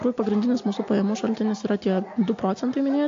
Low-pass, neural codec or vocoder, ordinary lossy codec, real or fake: 7.2 kHz; none; AAC, 48 kbps; real